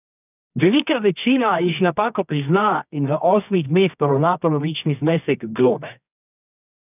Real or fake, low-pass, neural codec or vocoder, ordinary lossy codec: fake; 3.6 kHz; codec, 24 kHz, 0.9 kbps, WavTokenizer, medium music audio release; none